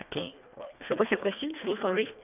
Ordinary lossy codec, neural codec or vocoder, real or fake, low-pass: none; codec, 24 kHz, 1.5 kbps, HILCodec; fake; 3.6 kHz